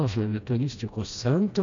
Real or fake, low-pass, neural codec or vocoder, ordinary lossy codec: fake; 7.2 kHz; codec, 16 kHz, 1 kbps, FreqCodec, smaller model; MP3, 64 kbps